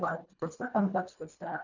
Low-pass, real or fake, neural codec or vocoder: 7.2 kHz; fake; codec, 24 kHz, 1.5 kbps, HILCodec